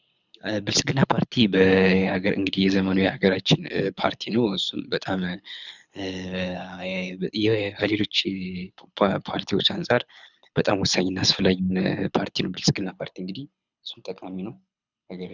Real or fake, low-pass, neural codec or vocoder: fake; 7.2 kHz; codec, 24 kHz, 6 kbps, HILCodec